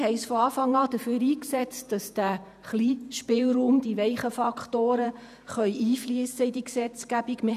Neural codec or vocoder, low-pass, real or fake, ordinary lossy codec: vocoder, 48 kHz, 128 mel bands, Vocos; 14.4 kHz; fake; none